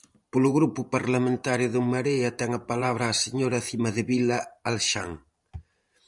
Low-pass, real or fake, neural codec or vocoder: 10.8 kHz; fake; vocoder, 44.1 kHz, 128 mel bands every 512 samples, BigVGAN v2